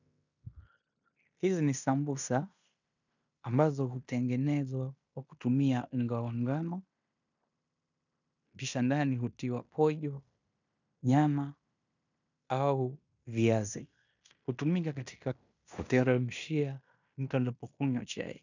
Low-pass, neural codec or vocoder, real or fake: 7.2 kHz; codec, 16 kHz in and 24 kHz out, 0.9 kbps, LongCat-Audio-Codec, fine tuned four codebook decoder; fake